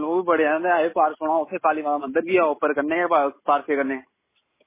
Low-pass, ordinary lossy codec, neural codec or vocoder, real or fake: 3.6 kHz; MP3, 16 kbps; none; real